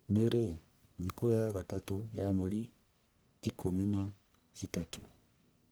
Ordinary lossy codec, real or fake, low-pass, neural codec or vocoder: none; fake; none; codec, 44.1 kHz, 1.7 kbps, Pupu-Codec